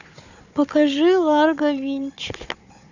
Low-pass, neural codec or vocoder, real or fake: 7.2 kHz; codec, 16 kHz, 4 kbps, FunCodec, trained on Chinese and English, 50 frames a second; fake